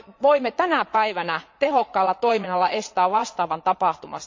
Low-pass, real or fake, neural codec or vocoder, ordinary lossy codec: 7.2 kHz; fake; vocoder, 22.05 kHz, 80 mel bands, Vocos; MP3, 48 kbps